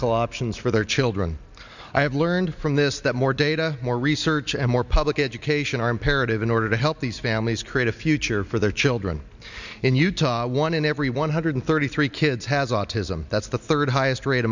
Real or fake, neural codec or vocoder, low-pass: real; none; 7.2 kHz